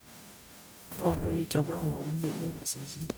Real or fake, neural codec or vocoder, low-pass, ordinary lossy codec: fake; codec, 44.1 kHz, 0.9 kbps, DAC; none; none